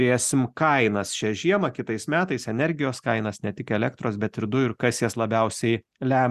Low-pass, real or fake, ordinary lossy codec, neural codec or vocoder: 14.4 kHz; real; Opus, 64 kbps; none